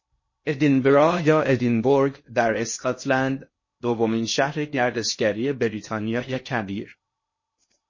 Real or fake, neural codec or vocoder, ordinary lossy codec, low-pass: fake; codec, 16 kHz in and 24 kHz out, 0.6 kbps, FocalCodec, streaming, 2048 codes; MP3, 32 kbps; 7.2 kHz